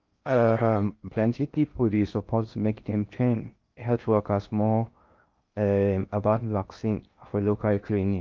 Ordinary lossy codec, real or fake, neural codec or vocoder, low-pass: Opus, 24 kbps; fake; codec, 16 kHz in and 24 kHz out, 0.6 kbps, FocalCodec, streaming, 2048 codes; 7.2 kHz